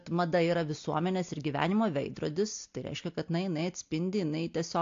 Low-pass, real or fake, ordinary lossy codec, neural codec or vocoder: 7.2 kHz; real; AAC, 48 kbps; none